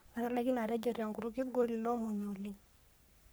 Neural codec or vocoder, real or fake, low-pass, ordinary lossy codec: codec, 44.1 kHz, 3.4 kbps, Pupu-Codec; fake; none; none